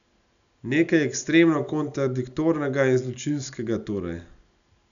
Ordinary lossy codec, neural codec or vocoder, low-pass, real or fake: none; none; 7.2 kHz; real